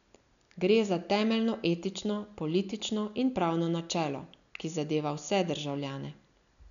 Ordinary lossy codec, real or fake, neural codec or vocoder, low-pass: none; real; none; 7.2 kHz